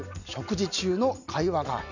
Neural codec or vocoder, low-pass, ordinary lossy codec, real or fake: none; 7.2 kHz; none; real